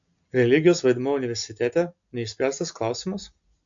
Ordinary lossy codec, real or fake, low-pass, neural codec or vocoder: AAC, 64 kbps; real; 7.2 kHz; none